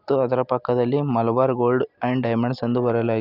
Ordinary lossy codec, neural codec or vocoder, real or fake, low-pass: none; none; real; 5.4 kHz